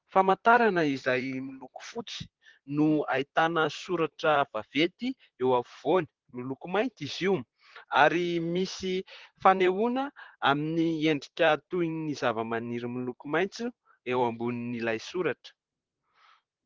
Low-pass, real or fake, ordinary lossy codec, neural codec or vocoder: 7.2 kHz; fake; Opus, 16 kbps; vocoder, 44.1 kHz, 128 mel bands, Pupu-Vocoder